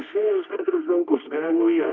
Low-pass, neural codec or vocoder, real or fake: 7.2 kHz; codec, 24 kHz, 0.9 kbps, WavTokenizer, medium music audio release; fake